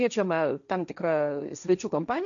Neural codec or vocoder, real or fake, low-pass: codec, 16 kHz, 1.1 kbps, Voila-Tokenizer; fake; 7.2 kHz